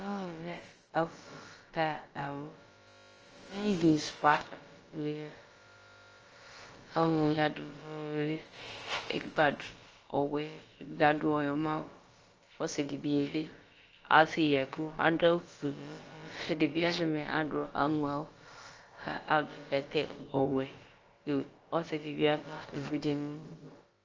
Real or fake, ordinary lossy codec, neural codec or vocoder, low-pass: fake; Opus, 24 kbps; codec, 16 kHz, about 1 kbps, DyCAST, with the encoder's durations; 7.2 kHz